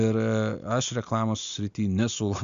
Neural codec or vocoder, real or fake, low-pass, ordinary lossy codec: none; real; 7.2 kHz; Opus, 64 kbps